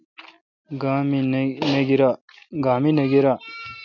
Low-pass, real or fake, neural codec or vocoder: 7.2 kHz; real; none